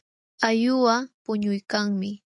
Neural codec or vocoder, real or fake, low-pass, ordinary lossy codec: none; real; 10.8 kHz; AAC, 64 kbps